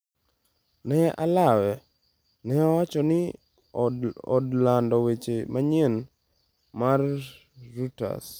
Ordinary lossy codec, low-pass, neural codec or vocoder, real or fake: none; none; none; real